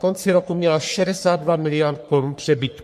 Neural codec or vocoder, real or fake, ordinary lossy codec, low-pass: codec, 44.1 kHz, 3.4 kbps, Pupu-Codec; fake; MP3, 64 kbps; 14.4 kHz